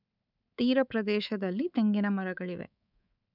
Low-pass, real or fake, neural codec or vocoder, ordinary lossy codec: 5.4 kHz; fake; codec, 24 kHz, 3.1 kbps, DualCodec; none